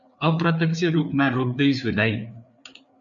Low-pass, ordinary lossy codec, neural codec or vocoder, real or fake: 7.2 kHz; MP3, 64 kbps; codec, 16 kHz, 4 kbps, FreqCodec, larger model; fake